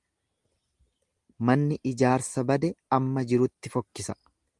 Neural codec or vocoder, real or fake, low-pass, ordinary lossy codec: none; real; 10.8 kHz; Opus, 24 kbps